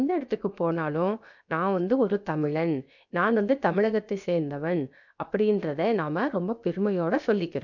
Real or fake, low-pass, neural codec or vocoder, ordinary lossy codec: fake; 7.2 kHz; codec, 16 kHz, about 1 kbps, DyCAST, with the encoder's durations; none